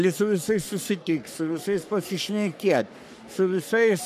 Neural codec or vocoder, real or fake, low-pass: codec, 44.1 kHz, 3.4 kbps, Pupu-Codec; fake; 14.4 kHz